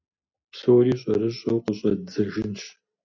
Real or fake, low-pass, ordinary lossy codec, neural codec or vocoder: real; 7.2 kHz; MP3, 64 kbps; none